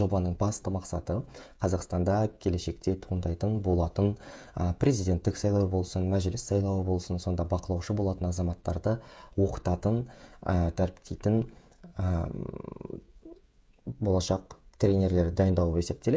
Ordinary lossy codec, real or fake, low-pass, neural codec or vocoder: none; fake; none; codec, 16 kHz, 16 kbps, FreqCodec, smaller model